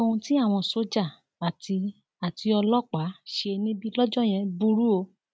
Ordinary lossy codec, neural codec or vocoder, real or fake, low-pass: none; none; real; none